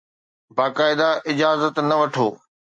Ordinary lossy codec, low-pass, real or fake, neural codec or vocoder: MP3, 48 kbps; 9.9 kHz; real; none